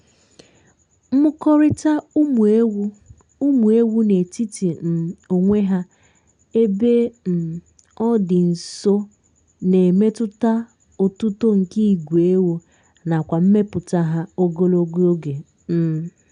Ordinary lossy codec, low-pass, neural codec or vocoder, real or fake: none; 9.9 kHz; none; real